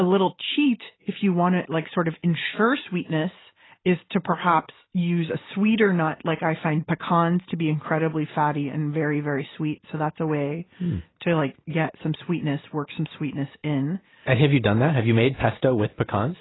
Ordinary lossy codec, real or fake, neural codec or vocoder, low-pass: AAC, 16 kbps; real; none; 7.2 kHz